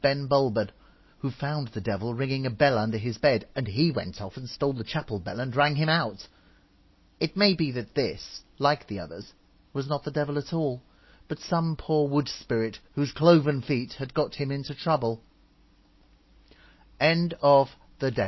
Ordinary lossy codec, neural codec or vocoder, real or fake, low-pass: MP3, 24 kbps; none; real; 7.2 kHz